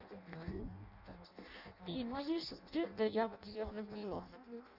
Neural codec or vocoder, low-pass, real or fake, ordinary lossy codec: codec, 16 kHz in and 24 kHz out, 0.6 kbps, FireRedTTS-2 codec; 5.4 kHz; fake; none